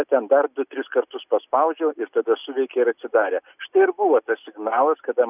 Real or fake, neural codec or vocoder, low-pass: real; none; 3.6 kHz